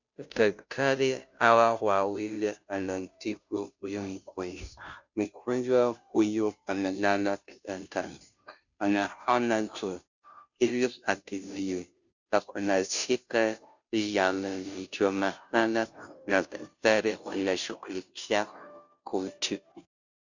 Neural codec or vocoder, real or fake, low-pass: codec, 16 kHz, 0.5 kbps, FunCodec, trained on Chinese and English, 25 frames a second; fake; 7.2 kHz